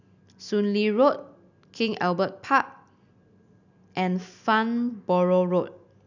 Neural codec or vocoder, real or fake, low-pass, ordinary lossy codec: none; real; 7.2 kHz; none